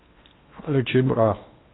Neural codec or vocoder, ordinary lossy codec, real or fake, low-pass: codec, 16 kHz in and 24 kHz out, 0.8 kbps, FocalCodec, streaming, 65536 codes; AAC, 16 kbps; fake; 7.2 kHz